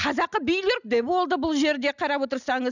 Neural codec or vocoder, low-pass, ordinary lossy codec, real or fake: none; 7.2 kHz; none; real